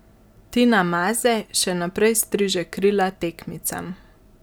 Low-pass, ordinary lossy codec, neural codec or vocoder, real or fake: none; none; none; real